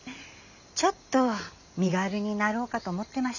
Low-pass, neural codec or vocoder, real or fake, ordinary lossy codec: 7.2 kHz; none; real; none